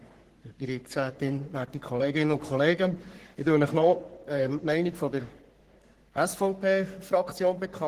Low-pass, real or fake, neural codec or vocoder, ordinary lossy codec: 14.4 kHz; fake; codec, 44.1 kHz, 3.4 kbps, Pupu-Codec; Opus, 16 kbps